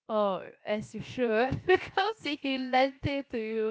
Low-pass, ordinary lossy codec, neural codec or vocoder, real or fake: none; none; codec, 16 kHz, 0.7 kbps, FocalCodec; fake